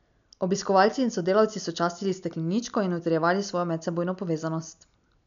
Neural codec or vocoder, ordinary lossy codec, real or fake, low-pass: none; none; real; 7.2 kHz